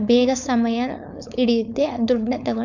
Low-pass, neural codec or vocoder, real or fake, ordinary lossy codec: 7.2 kHz; codec, 16 kHz, 4.8 kbps, FACodec; fake; none